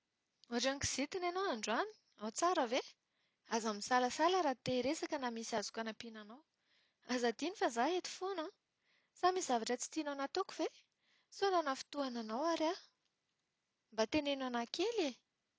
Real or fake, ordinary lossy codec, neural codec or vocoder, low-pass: real; none; none; none